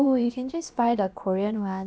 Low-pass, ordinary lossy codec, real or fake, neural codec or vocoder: none; none; fake; codec, 16 kHz, about 1 kbps, DyCAST, with the encoder's durations